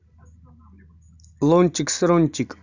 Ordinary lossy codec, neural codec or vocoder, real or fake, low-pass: none; none; real; 7.2 kHz